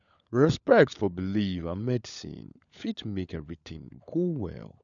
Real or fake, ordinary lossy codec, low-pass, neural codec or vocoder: fake; MP3, 96 kbps; 7.2 kHz; codec, 16 kHz, 16 kbps, FunCodec, trained on LibriTTS, 50 frames a second